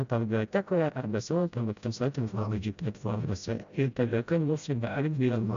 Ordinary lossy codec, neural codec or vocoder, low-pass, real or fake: MP3, 64 kbps; codec, 16 kHz, 0.5 kbps, FreqCodec, smaller model; 7.2 kHz; fake